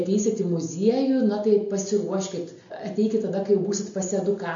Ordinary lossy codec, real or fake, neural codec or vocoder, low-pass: AAC, 64 kbps; real; none; 7.2 kHz